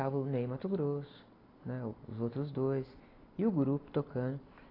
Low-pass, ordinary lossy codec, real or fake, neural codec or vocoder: 5.4 kHz; AAC, 24 kbps; real; none